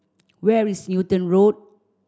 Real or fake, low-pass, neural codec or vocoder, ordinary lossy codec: real; none; none; none